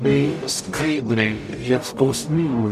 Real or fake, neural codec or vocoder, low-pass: fake; codec, 44.1 kHz, 0.9 kbps, DAC; 14.4 kHz